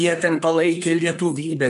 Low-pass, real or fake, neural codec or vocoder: 10.8 kHz; fake; codec, 24 kHz, 1 kbps, SNAC